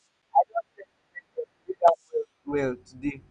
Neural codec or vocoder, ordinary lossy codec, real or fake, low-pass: none; none; real; 9.9 kHz